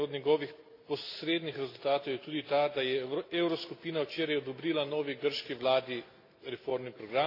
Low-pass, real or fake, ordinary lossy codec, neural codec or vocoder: 5.4 kHz; real; AAC, 32 kbps; none